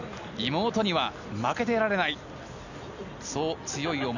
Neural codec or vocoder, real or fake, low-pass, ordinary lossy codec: none; real; 7.2 kHz; none